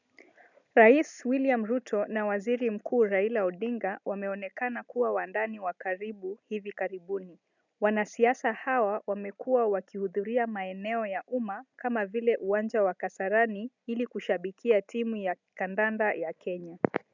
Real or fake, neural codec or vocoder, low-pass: real; none; 7.2 kHz